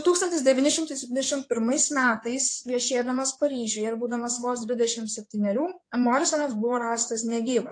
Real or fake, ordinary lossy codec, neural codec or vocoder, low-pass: fake; AAC, 48 kbps; codec, 16 kHz in and 24 kHz out, 2.2 kbps, FireRedTTS-2 codec; 9.9 kHz